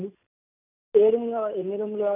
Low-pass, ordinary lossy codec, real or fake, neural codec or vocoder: 3.6 kHz; none; real; none